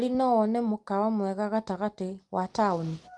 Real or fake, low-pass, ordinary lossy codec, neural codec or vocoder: real; 10.8 kHz; Opus, 32 kbps; none